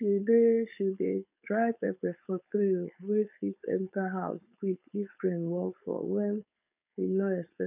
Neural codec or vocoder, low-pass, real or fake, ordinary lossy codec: codec, 16 kHz, 4.8 kbps, FACodec; 3.6 kHz; fake; none